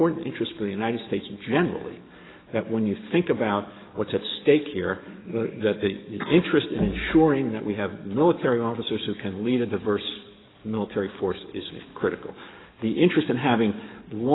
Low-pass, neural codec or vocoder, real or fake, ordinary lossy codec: 7.2 kHz; codec, 44.1 kHz, 7.8 kbps, DAC; fake; AAC, 16 kbps